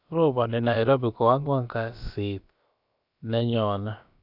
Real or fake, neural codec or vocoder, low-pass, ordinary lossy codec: fake; codec, 16 kHz, about 1 kbps, DyCAST, with the encoder's durations; 5.4 kHz; none